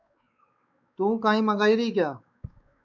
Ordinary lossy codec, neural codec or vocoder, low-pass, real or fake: MP3, 64 kbps; codec, 16 kHz, 4 kbps, X-Codec, WavLM features, trained on Multilingual LibriSpeech; 7.2 kHz; fake